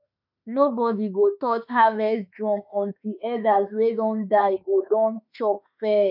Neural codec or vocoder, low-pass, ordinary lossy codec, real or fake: autoencoder, 48 kHz, 32 numbers a frame, DAC-VAE, trained on Japanese speech; 5.4 kHz; none; fake